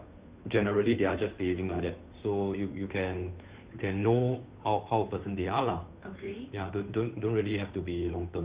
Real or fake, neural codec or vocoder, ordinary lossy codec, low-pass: fake; codec, 16 kHz, 2 kbps, FunCodec, trained on Chinese and English, 25 frames a second; Opus, 64 kbps; 3.6 kHz